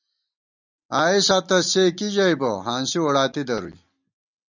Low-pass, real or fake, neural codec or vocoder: 7.2 kHz; real; none